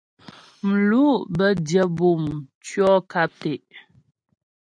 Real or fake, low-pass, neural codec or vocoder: real; 9.9 kHz; none